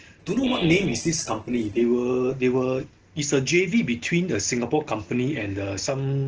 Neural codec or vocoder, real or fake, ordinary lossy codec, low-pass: none; real; Opus, 16 kbps; 7.2 kHz